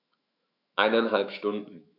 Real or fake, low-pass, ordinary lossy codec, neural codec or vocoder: fake; 5.4 kHz; none; autoencoder, 48 kHz, 128 numbers a frame, DAC-VAE, trained on Japanese speech